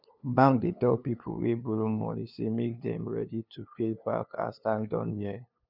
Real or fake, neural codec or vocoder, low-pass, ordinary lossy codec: fake; codec, 16 kHz, 2 kbps, FunCodec, trained on LibriTTS, 25 frames a second; 5.4 kHz; none